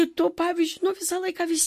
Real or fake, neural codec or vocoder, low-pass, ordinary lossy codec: real; none; 14.4 kHz; MP3, 64 kbps